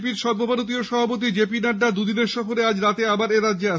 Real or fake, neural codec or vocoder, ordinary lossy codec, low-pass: real; none; none; none